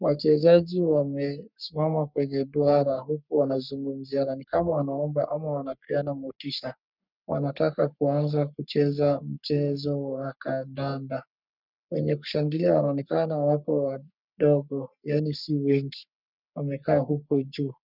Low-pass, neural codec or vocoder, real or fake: 5.4 kHz; codec, 44.1 kHz, 3.4 kbps, Pupu-Codec; fake